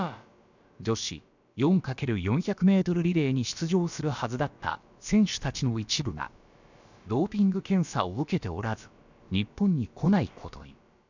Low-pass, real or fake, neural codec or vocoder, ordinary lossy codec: 7.2 kHz; fake; codec, 16 kHz, about 1 kbps, DyCAST, with the encoder's durations; none